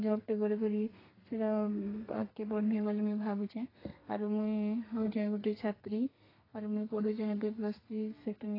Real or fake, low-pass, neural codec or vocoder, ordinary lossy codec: fake; 5.4 kHz; codec, 32 kHz, 1.9 kbps, SNAC; AAC, 24 kbps